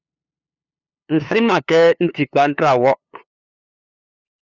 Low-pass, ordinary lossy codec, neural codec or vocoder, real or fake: 7.2 kHz; Opus, 64 kbps; codec, 16 kHz, 2 kbps, FunCodec, trained on LibriTTS, 25 frames a second; fake